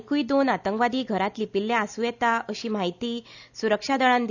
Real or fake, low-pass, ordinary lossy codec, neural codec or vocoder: real; 7.2 kHz; none; none